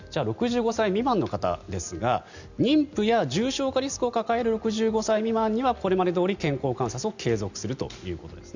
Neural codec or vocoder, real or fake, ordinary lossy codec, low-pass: none; real; none; 7.2 kHz